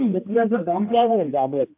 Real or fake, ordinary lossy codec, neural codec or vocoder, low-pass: fake; AAC, 32 kbps; codec, 16 kHz, 1 kbps, X-Codec, HuBERT features, trained on general audio; 3.6 kHz